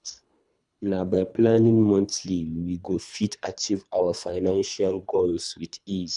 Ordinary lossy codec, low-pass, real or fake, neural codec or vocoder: none; 10.8 kHz; fake; codec, 24 kHz, 3 kbps, HILCodec